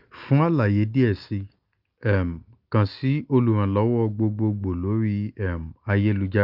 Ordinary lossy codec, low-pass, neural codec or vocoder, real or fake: Opus, 24 kbps; 5.4 kHz; none; real